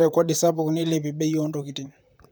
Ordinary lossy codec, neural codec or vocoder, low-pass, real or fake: none; vocoder, 44.1 kHz, 128 mel bands, Pupu-Vocoder; none; fake